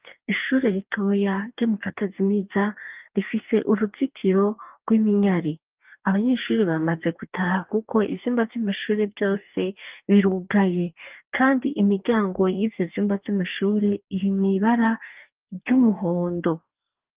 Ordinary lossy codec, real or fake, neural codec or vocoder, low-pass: Opus, 32 kbps; fake; codec, 44.1 kHz, 2.6 kbps, DAC; 3.6 kHz